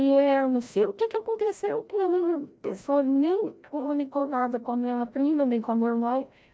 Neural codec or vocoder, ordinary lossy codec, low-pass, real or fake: codec, 16 kHz, 0.5 kbps, FreqCodec, larger model; none; none; fake